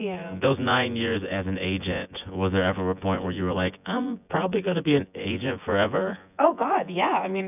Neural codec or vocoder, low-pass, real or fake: vocoder, 24 kHz, 100 mel bands, Vocos; 3.6 kHz; fake